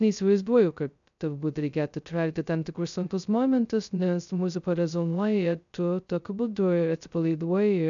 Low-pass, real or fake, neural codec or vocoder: 7.2 kHz; fake; codec, 16 kHz, 0.2 kbps, FocalCodec